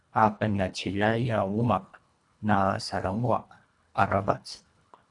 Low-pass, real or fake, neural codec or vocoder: 10.8 kHz; fake; codec, 24 kHz, 1.5 kbps, HILCodec